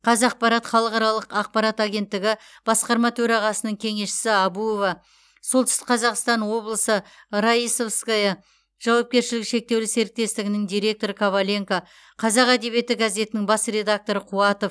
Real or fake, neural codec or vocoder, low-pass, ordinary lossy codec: real; none; none; none